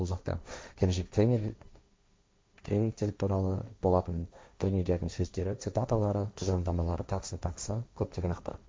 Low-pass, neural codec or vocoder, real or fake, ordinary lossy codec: none; codec, 16 kHz, 1.1 kbps, Voila-Tokenizer; fake; none